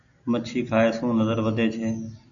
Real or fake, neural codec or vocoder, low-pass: real; none; 7.2 kHz